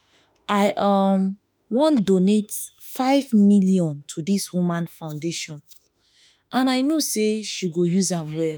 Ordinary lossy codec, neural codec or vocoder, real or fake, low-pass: none; autoencoder, 48 kHz, 32 numbers a frame, DAC-VAE, trained on Japanese speech; fake; none